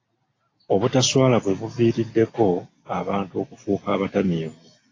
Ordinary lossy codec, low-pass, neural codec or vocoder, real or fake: AAC, 32 kbps; 7.2 kHz; none; real